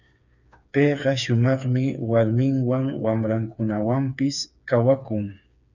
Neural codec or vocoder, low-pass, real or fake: codec, 16 kHz, 4 kbps, FreqCodec, smaller model; 7.2 kHz; fake